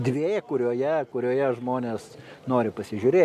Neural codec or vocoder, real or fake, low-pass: none; real; 14.4 kHz